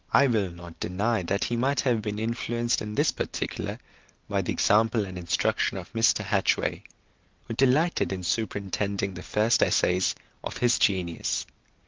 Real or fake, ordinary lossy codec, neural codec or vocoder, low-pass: real; Opus, 24 kbps; none; 7.2 kHz